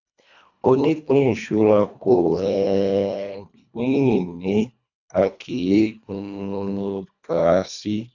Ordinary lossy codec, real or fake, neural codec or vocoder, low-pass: none; fake; codec, 24 kHz, 1.5 kbps, HILCodec; 7.2 kHz